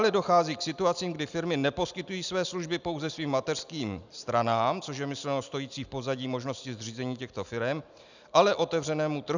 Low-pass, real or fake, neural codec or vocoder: 7.2 kHz; real; none